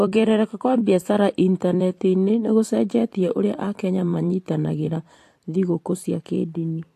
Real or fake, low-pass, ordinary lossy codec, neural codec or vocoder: fake; 14.4 kHz; AAC, 64 kbps; vocoder, 44.1 kHz, 128 mel bands every 256 samples, BigVGAN v2